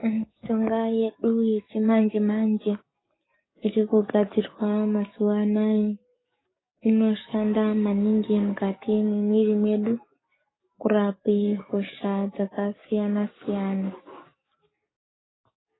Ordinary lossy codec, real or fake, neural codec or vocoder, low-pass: AAC, 16 kbps; fake; codec, 44.1 kHz, 7.8 kbps, DAC; 7.2 kHz